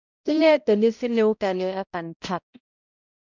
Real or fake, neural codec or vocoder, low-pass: fake; codec, 16 kHz, 0.5 kbps, X-Codec, HuBERT features, trained on balanced general audio; 7.2 kHz